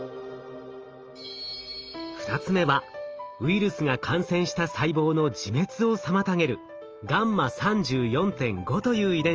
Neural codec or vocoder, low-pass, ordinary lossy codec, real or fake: none; 7.2 kHz; Opus, 24 kbps; real